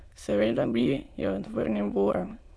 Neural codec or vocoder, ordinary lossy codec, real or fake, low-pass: autoencoder, 22.05 kHz, a latent of 192 numbers a frame, VITS, trained on many speakers; none; fake; none